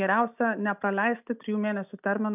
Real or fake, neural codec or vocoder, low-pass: real; none; 3.6 kHz